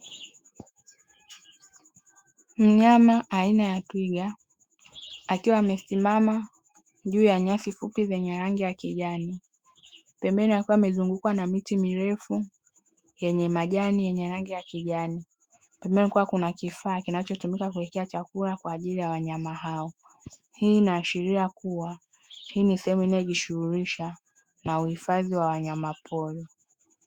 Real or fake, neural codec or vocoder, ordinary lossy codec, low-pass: fake; autoencoder, 48 kHz, 128 numbers a frame, DAC-VAE, trained on Japanese speech; Opus, 64 kbps; 19.8 kHz